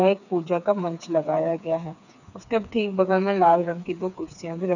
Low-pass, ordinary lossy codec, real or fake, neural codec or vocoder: 7.2 kHz; none; fake; codec, 16 kHz, 4 kbps, FreqCodec, smaller model